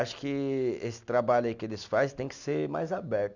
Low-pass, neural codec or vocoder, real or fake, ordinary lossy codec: 7.2 kHz; none; real; none